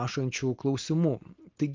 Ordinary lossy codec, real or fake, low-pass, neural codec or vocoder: Opus, 32 kbps; real; 7.2 kHz; none